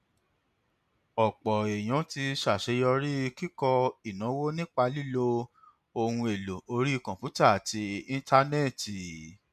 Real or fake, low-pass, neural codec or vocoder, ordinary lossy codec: real; 14.4 kHz; none; none